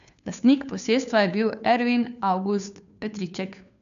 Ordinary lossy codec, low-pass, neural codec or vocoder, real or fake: AAC, 96 kbps; 7.2 kHz; codec, 16 kHz, 2 kbps, FunCodec, trained on Chinese and English, 25 frames a second; fake